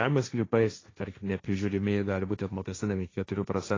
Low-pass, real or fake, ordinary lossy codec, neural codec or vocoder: 7.2 kHz; fake; AAC, 32 kbps; codec, 16 kHz, 1.1 kbps, Voila-Tokenizer